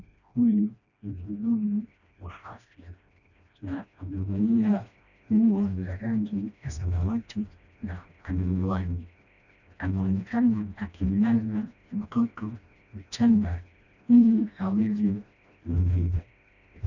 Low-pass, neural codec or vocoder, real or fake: 7.2 kHz; codec, 16 kHz, 1 kbps, FreqCodec, smaller model; fake